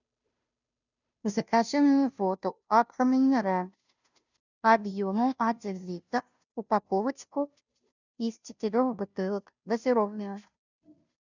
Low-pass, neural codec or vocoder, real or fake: 7.2 kHz; codec, 16 kHz, 0.5 kbps, FunCodec, trained on Chinese and English, 25 frames a second; fake